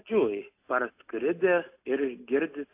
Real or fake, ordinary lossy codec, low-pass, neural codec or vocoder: real; AAC, 24 kbps; 3.6 kHz; none